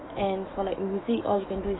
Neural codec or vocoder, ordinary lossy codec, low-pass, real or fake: autoencoder, 48 kHz, 128 numbers a frame, DAC-VAE, trained on Japanese speech; AAC, 16 kbps; 7.2 kHz; fake